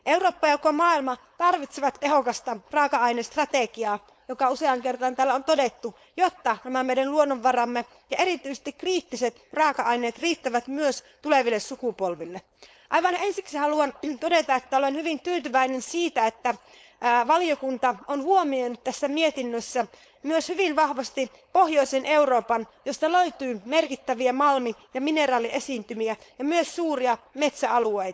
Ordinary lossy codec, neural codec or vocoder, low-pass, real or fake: none; codec, 16 kHz, 4.8 kbps, FACodec; none; fake